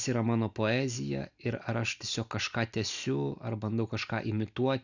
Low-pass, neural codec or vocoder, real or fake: 7.2 kHz; none; real